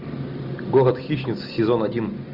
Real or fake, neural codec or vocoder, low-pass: real; none; 5.4 kHz